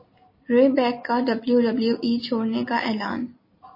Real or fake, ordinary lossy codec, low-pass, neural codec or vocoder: real; MP3, 24 kbps; 5.4 kHz; none